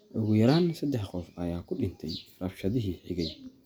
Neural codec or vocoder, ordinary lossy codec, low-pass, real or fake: none; none; none; real